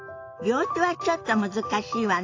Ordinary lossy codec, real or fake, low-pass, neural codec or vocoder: AAC, 32 kbps; real; 7.2 kHz; none